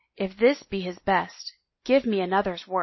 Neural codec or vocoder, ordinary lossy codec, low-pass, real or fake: none; MP3, 24 kbps; 7.2 kHz; real